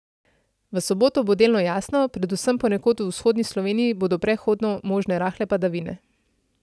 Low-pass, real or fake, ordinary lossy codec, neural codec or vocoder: none; real; none; none